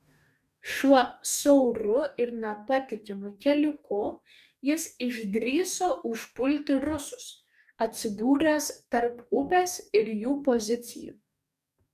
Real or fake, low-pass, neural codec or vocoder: fake; 14.4 kHz; codec, 44.1 kHz, 2.6 kbps, DAC